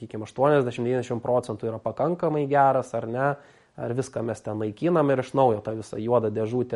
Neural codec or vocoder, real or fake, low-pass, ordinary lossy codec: none; real; 19.8 kHz; MP3, 48 kbps